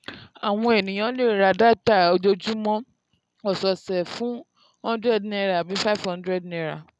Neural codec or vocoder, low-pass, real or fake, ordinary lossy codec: none; none; real; none